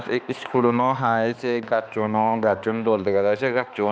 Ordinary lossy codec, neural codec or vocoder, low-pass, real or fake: none; codec, 16 kHz, 2 kbps, X-Codec, HuBERT features, trained on balanced general audio; none; fake